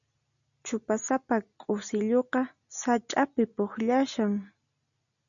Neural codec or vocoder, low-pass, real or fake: none; 7.2 kHz; real